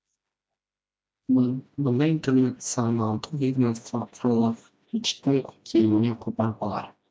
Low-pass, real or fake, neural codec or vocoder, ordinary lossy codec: none; fake; codec, 16 kHz, 1 kbps, FreqCodec, smaller model; none